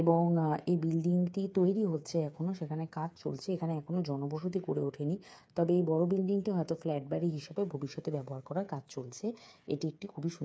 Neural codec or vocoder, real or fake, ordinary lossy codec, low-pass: codec, 16 kHz, 8 kbps, FreqCodec, smaller model; fake; none; none